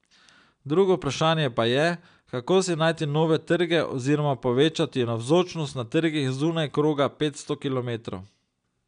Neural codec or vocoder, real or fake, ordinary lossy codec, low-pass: none; real; none; 9.9 kHz